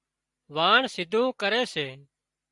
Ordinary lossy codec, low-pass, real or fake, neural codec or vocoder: Opus, 64 kbps; 10.8 kHz; real; none